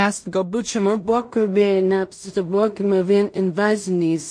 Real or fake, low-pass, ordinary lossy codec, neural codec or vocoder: fake; 9.9 kHz; MP3, 48 kbps; codec, 16 kHz in and 24 kHz out, 0.4 kbps, LongCat-Audio-Codec, two codebook decoder